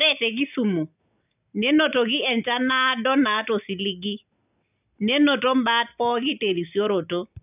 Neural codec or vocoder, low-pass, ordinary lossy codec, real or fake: none; 3.6 kHz; none; real